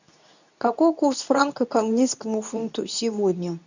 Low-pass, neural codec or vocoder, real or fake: 7.2 kHz; codec, 24 kHz, 0.9 kbps, WavTokenizer, medium speech release version 2; fake